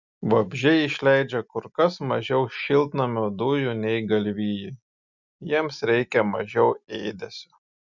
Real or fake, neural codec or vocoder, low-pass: real; none; 7.2 kHz